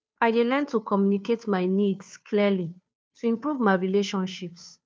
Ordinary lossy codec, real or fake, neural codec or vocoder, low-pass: none; fake; codec, 16 kHz, 2 kbps, FunCodec, trained on Chinese and English, 25 frames a second; none